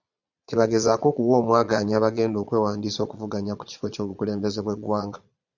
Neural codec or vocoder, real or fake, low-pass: vocoder, 22.05 kHz, 80 mel bands, Vocos; fake; 7.2 kHz